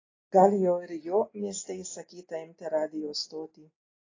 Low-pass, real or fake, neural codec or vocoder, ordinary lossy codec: 7.2 kHz; real; none; AAC, 32 kbps